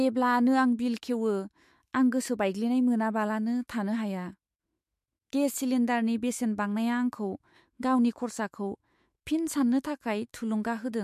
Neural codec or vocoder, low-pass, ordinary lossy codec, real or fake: autoencoder, 48 kHz, 128 numbers a frame, DAC-VAE, trained on Japanese speech; 14.4 kHz; MP3, 64 kbps; fake